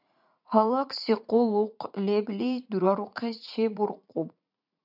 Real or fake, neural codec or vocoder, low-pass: fake; vocoder, 44.1 kHz, 80 mel bands, Vocos; 5.4 kHz